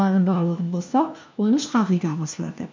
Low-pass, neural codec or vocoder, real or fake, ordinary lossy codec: 7.2 kHz; codec, 16 kHz, 1 kbps, FunCodec, trained on LibriTTS, 50 frames a second; fake; MP3, 64 kbps